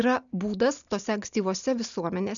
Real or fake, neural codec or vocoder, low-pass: real; none; 7.2 kHz